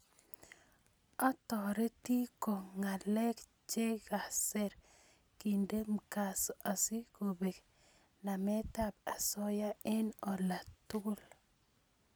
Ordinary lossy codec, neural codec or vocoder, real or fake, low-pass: none; none; real; none